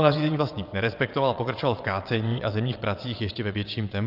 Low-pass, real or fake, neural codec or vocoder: 5.4 kHz; fake; vocoder, 22.05 kHz, 80 mel bands, WaveNeXt